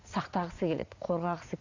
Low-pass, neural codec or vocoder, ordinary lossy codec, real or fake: 7.2 kHz; vocoder, 44.1 kHz, 80 mel bands, Vocos; AAC, 48 kbps; fake